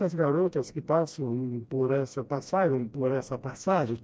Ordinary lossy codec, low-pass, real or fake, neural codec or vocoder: none; none; fake; codec, 16 kHz, 1 kbps, FreqCodec, smaller model